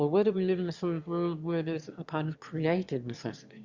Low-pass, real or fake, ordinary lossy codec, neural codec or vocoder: 7.2 kHz; fake; Opus, 64 kbps; autoencoder, 22.05 kHz, a latent of 192 numbers a frame, VITS, trained on one speaker